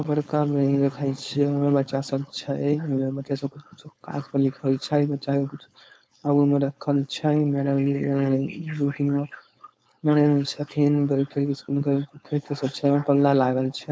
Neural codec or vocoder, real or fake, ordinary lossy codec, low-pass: codec, 16 kHz, 4.8 kbps, FACodec; fake; none; none